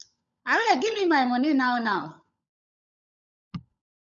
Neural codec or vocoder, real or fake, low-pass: codec, 16 kHz, 16 kbps, FunCodec, trained on LibriTTS, 50 frames a second; fake; 7.2 kHz